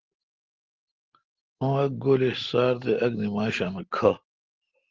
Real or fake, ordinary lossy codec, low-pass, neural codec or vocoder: real; Opus, 16 kbps; 7.2 kHz; none